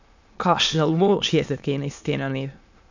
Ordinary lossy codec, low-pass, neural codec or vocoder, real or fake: none; 7.2 kHz; autoencoder, 22.05 kHz, a latent of 192 numbers a frame, VITS, trained on many speakers; fake